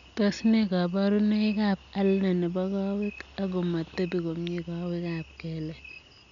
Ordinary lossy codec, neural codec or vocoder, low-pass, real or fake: none; none; 7.2 kHz; real